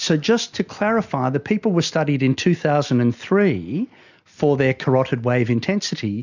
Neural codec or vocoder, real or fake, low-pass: none; real; 7.2 kHz